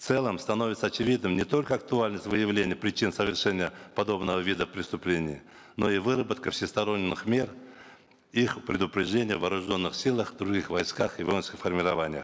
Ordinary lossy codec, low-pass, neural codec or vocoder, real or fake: none; none; none; real